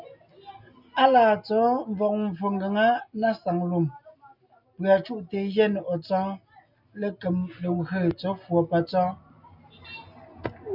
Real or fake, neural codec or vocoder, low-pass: real; none; 5.4 kHz